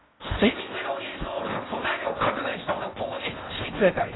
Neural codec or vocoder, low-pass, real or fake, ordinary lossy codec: codec, 16 kHz in and 24 kHz out, 0.6 kbps, FocalCodec, streaming, 4096 codes; 7.2 kHz; fake; AAC, 16 kbps